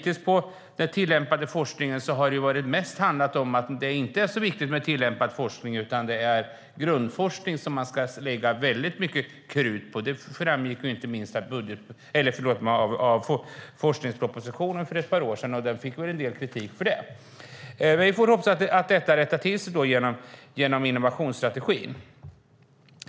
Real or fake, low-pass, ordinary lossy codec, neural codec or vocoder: real; none; none; none